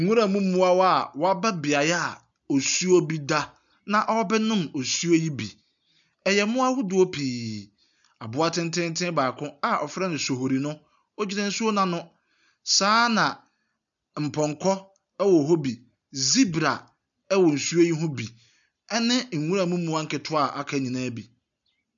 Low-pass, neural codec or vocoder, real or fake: 7.2 kHz; none; real